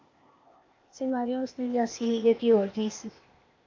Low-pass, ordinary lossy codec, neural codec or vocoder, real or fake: 7.2 kHz; MP3, 64 kbps; codec, 16 kHz, 0.8 kbps, ZipCodec; fake